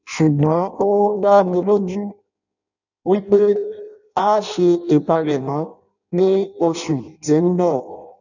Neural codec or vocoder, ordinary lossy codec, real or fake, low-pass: codec, 16 kHz in and 24 kHz out, 0.6 kbps, FireRedTTS-2 codec; none; fake; 7.2 kHz